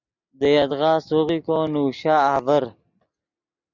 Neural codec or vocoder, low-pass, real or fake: none; 7.2 kHz; real